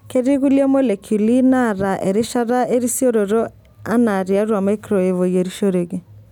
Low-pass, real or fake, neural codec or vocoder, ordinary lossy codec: 19.8 kHz; real; none; none